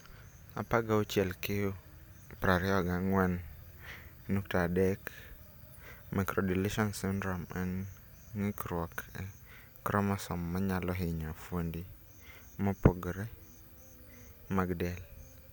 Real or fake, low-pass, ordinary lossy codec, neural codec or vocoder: real; none; none; none